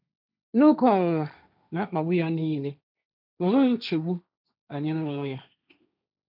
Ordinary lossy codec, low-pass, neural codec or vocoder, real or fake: none; 5.4 kHz; codec, 16 kHz, 1.1 kbps, Voila-Tokenizer; fake